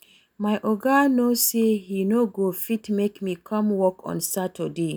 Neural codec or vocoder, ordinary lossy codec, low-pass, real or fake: none; none; none; real